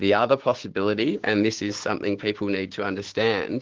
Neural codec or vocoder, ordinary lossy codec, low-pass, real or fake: codec, 16 kHz, 6 kbps, DAC; Opus, 16 kbps; 7.2 kHz; fake